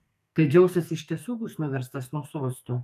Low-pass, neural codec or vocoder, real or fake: 14.4 kHz; codec, 44.1 kHz, 2.6 kbps, SNAC; fake